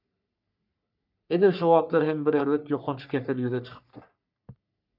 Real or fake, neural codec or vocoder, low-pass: fake; codec, 44.1 kHz, 3.4 kbps, Pupu-Codec; 5.4 kHz